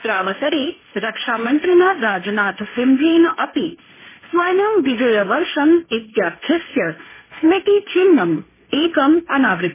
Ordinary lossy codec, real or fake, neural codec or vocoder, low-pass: MP3, 16 kbps; fake; codec, 16 kHz, 1.1 kbps, Voila-Tokenizer; 3.6 kHz